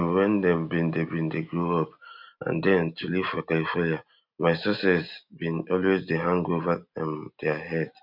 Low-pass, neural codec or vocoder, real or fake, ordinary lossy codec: 5.4 kHz; none; real; none